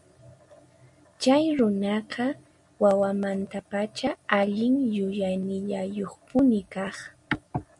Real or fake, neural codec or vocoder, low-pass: real; none; 10.8 kHz